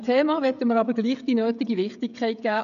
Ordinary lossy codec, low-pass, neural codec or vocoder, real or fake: none; 7.2 kHz; codec, 16 kHz, 16 kbps, FreqCodec, smaller model; fake